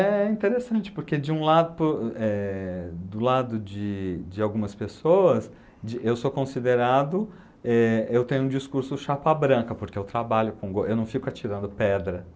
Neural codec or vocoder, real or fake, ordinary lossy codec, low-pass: none; real; none; none